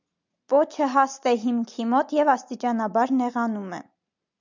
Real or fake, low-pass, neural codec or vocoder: real; 7.2 kHz; none